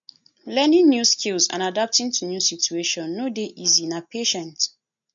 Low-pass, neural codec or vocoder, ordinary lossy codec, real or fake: 7.2 kHz; none; MP3, 48 kbps; real